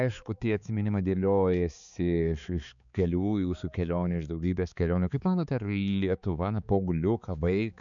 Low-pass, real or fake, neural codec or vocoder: 7.2 kHz; fake; codec, 16 kHz, 4 kbps, X-Codec, HuBERT features, trained on balanced general audio